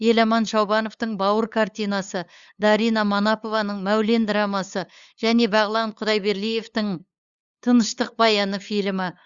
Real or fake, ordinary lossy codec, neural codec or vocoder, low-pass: fake; Opus, 64 kbps; codec, 16 kHz, 8 kbps, FunCodec, trained on LibriTTS, 25 frames a second; 7.2 kHz